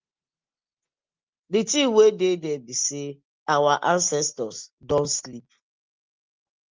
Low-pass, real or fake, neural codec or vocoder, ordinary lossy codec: 7.2 kHz; real; none; Opus, 24 kbps